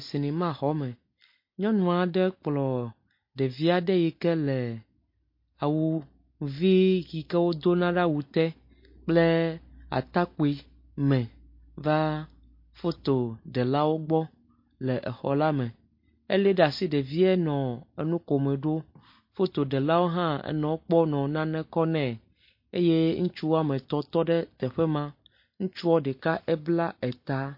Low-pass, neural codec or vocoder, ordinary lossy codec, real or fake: 5.4 kHz; none; MP3, 32 kbps; real